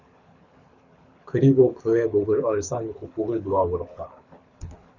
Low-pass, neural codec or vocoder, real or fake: 7.2 kHz; codec, 24 kHz, 6 kbps, HILCodec; fake